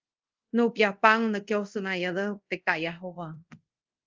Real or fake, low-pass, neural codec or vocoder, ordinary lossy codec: fake; 7.2 kHz; codec, 24 kHz, 0.5 kbps, DualCodec; Opus, 32 kbps